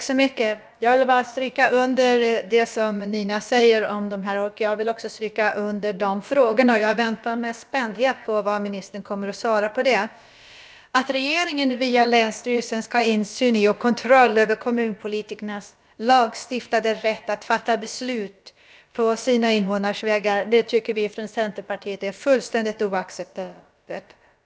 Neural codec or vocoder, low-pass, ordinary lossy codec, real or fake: codec, 16 kHz, about 1 kbps, DyCAST, with the encoder's durations; none; none; fake